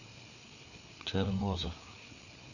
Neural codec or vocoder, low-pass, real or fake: codec, 16 kHz, 4 kbps, FreqCodec, larger model; 7.2 kHz; fake